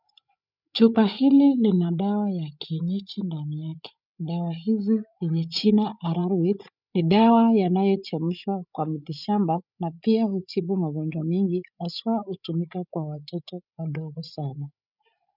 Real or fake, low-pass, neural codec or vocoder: fake; 5.4 kHz; codec, 16 kHz, 8 kbps, FreqCodec, larger model